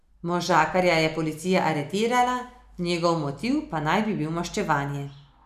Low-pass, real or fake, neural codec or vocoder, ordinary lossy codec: 14.4 kHz; real; none; none